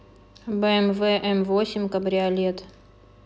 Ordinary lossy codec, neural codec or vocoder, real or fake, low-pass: none; none; real; none